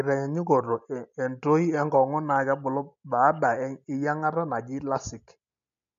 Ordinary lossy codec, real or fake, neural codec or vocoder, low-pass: AAC, 64 kbps; real; none; 7.2 kHz